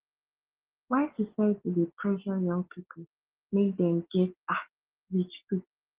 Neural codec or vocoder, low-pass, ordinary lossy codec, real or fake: none; 3.6 kHz; Opus, 16 kbps; real